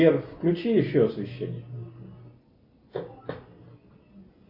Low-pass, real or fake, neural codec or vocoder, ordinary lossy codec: 5.4 kHz; real; none; AAC, 48 kbps